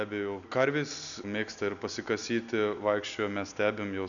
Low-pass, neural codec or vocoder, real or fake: 7.2 kHz; none; real